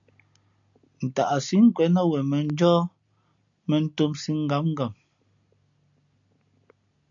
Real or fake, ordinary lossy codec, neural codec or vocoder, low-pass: real; MP3, 96 kbps; none; 7.2 kHz